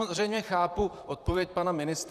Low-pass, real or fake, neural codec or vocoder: 14.4 kHz; fake; vocoder, 44.1 kHz, 128 mel bands, Pupu-Vocoder